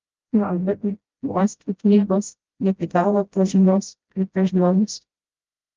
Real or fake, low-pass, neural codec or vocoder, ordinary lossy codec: fake; 7.2 kHz; codec, 16 kHz, 0.5 kbps, FreqCodec, smaller model; Opus, 24 kbps